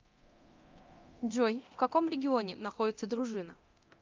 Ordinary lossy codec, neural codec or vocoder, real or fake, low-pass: Opus, 24 kbps; codec, 24 kHz, 0.9 kbps, DualCodec; fake; 7.2 kHz